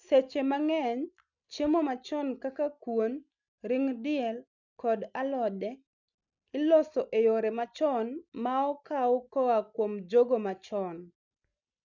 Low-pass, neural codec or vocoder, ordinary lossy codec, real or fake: 7.2 kHz; none; none; real